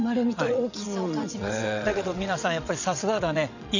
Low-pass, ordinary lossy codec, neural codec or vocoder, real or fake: 7.2 kHz; none; vocoder, 22.05 kHz, 80 mel bands, WaveNeXt; fake